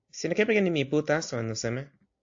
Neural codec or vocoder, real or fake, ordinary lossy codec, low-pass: none; real; AAC, 48 kbps; 7.2 kHz